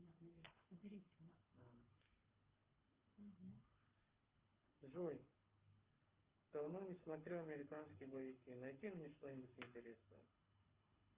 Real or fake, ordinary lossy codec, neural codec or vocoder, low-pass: fake; Opus, 32 kbps; codec, 24 kHz, 6 kbps, HILCodec; 3.6 kHz